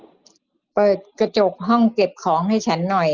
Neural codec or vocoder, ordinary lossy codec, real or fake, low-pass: none; Opus, 32 kbps; real; 7.2 kHz